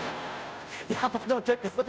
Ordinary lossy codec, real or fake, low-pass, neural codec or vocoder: none; fake; none; codec, 16 kHz, 0.5 kbps, FunCodec, trained on Chinese and English, 25 frames a second